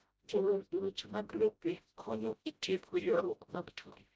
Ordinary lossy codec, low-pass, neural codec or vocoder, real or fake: none; none; codec, 16 kHz, 0.5 kbps, FreqCodec, smaller model; fake